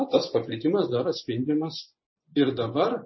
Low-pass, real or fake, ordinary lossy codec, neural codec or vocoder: 7.2 kHz; fake; MP3, 24 kbps; vocoder, 24 kHz, 100 mel bands, Vocos